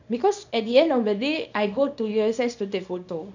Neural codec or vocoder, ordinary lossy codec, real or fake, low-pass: codec, 24 kHz, 0.9 kbps, WavTokenizer, small release; none; fake; 7.2 kHz